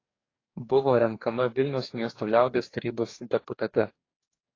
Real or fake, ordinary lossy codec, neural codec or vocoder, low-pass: fake; AAC, 32 kbps; codec, 44.1 kHz, 2.6 kbps, DAC; 7.2 kHz